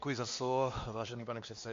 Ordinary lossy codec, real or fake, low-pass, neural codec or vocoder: MP3, 48 kbps; fake; 7.2 kHz; codec, 16 kHz, 2 kbps, X-Codec, WavLM features, trained on Multilingual LibriSpeech